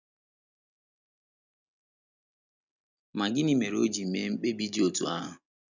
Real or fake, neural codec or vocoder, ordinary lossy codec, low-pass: real; none; none; 7.2 kHz